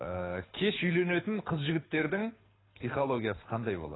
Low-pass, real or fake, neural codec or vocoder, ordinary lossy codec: 7.2 kHz; fake; codec, 24 kHz, 6 kbps, HILCodec; AAC, 16 kbps